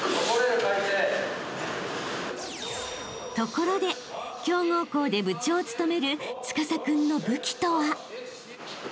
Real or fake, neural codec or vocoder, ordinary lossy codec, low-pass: real; none; none; none